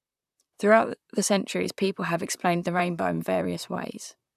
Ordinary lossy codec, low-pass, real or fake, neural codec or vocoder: none; 14.4 kHz; fake; vocoder, 44.1 kHz, 128 mel bands, Pupu-Vocoder